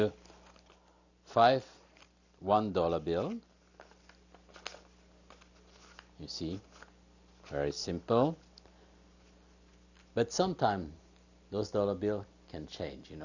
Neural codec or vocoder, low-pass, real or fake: none; 7.2 kHz; real